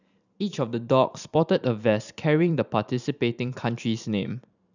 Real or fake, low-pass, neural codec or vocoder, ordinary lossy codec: fake; 7.2 kHz; vocoder, 44.1 kHz, 128 mel bands every 512 samples, BigVGAN v2; none